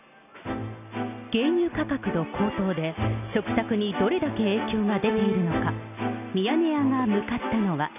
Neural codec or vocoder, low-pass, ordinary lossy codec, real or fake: none; 3.6 kHz; none; real